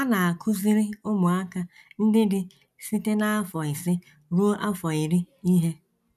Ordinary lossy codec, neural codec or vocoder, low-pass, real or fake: none; none; 14.4 kHz; real